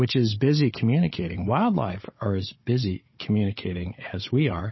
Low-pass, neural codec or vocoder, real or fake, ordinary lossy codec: 7.2 kHz; codec, 16 kHz, 16 kbps, FunCodec, trained on Chinese and English, 50 frames a second; fake; MP3, 24 kbps